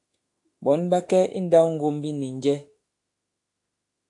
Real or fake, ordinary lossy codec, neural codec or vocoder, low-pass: fake; AAC, 48 kbps; autoencoder, 48 kHz, 32 numbers a frame, DAC-VAE, trained on Japanese speech; 10.8 kHz